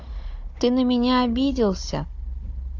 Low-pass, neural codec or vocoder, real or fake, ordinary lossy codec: 7.2 kHz; codec, 16 kHz, 16 kbps, FunCodec, trained on Chinese and English, 50 frames a second; fake; AAC, 48 kbps